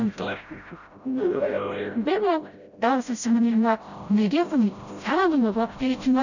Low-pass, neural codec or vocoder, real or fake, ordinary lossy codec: 7.2 kHz; codec, 16 kHz, 0.5 kbps, FreqCodec, smaller model; fake; none